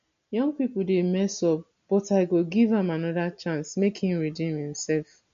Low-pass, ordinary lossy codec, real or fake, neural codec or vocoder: 7.2 kHz; MP3, 48 kbps; real; none